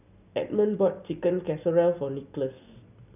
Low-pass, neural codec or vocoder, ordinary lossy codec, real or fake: 3.6 kHz; none; none; real